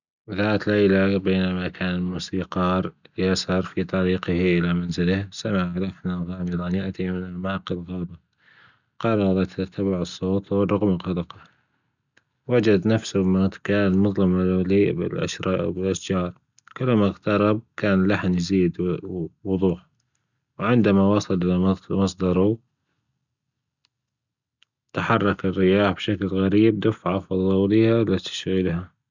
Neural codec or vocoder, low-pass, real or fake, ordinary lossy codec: none; 7.2 kHz; real; none